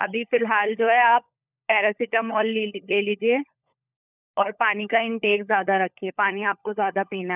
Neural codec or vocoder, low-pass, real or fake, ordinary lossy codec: codec, 16 kHz, 16 kbps, FunCodec, trained on LibriTTS, 50 frames a second; 3.6 kHz; fake; none